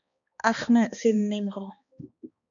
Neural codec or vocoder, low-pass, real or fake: codec, 16 kHz, 2 kbps, X-Codec, HuBERT features, trained on balanced general audio; 7.2 kHz; fake